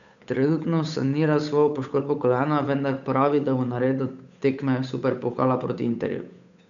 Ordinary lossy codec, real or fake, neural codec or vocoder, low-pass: none; fake; codec, 16 kHz, 8 kbps, FunCodec, trained on Chinese and English, 25 frames a second; 7.2 kHz